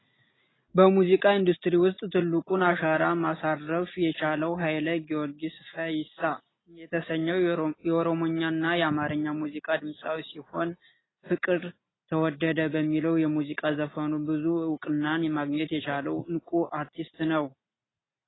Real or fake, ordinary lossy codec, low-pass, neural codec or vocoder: real; AAC, 16 kbps; 7.2 kHz; none